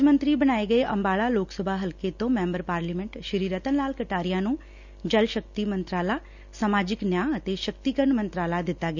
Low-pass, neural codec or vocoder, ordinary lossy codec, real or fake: 7.2 kHz; none; none; real